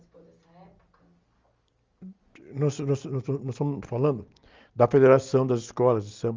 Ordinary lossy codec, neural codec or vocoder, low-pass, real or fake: Opus, 32 kbps; none; 7.2 kHz; real